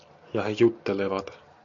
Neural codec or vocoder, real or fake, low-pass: none; real; 7.2 kHz